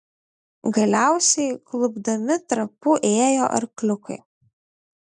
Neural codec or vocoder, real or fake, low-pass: none; real; 10.8 kHz